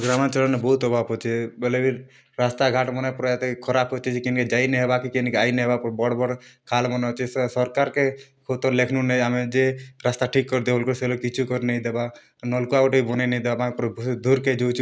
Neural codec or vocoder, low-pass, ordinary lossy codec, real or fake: none; none; none; real